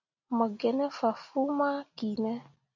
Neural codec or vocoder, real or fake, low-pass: none; real; 7.2 kHz